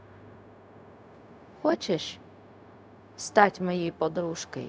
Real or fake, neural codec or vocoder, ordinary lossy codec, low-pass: fake; codec, 16 kHz, 0.4 kbps, LongCat-Audio-Codec; none; none